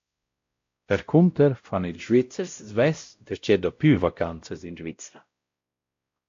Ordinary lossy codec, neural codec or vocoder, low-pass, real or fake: AAC, 48 kbps; codec, 16 kHz, 0.5 kbps, X-Codec, WavLM features, trained on Multilingual LibriSpeech; 7.2 kHz; fake